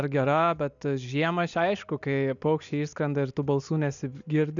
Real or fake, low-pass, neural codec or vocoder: real; 7.2 kHz; none